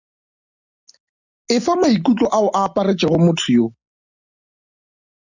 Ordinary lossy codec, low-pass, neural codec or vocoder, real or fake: Opus, 64 kbps; 7.2 kHz; none; real